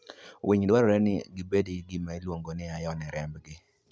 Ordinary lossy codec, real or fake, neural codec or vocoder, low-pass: none; real; none; none